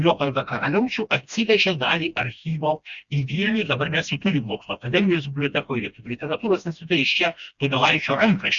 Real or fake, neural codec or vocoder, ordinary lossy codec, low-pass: fake; codec, 16 kHz, 1 kbps, FreqCodec, smaller model; Opus, 64 kbps; 7.2 kHz